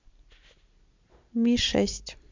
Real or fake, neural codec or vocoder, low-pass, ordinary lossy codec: real; none; 7.2 kHz; MP3, 64 kbps